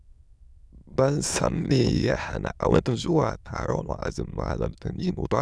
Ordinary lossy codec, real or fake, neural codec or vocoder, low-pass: none; fake; autoencoder, 22.05 kHz, a latent of 192 numbers a frame, VITS, trained on many speakers; none